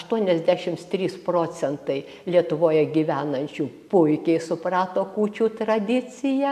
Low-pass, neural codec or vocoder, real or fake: 14.4 kHz; none; real